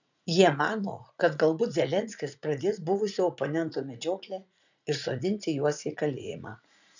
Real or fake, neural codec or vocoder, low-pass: fake; vocoder, 44.1 kHz, 80 mel bands, Vocos; 7.2 kHz